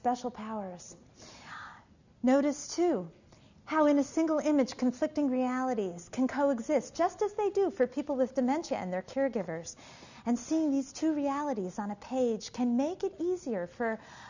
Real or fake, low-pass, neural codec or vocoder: real; 7.2 kHz; none